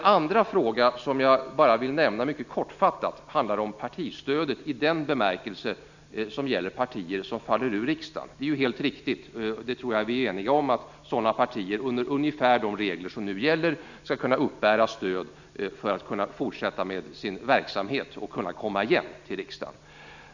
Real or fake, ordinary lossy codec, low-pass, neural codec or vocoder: real; none; 7.2 kHz; none